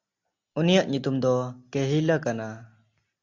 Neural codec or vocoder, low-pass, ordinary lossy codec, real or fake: none; 7.2 kHz; AAC, 48 kbps; real